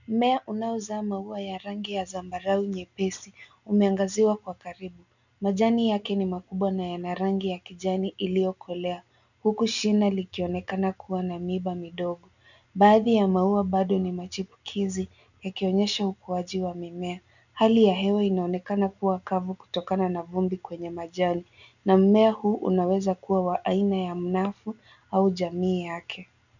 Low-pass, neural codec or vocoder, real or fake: 7.2 kHz; none; real